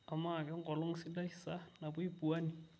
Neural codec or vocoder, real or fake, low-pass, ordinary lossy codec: none; real; none; none